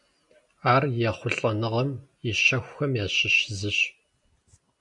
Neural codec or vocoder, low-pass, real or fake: none; 10.8 kHz; real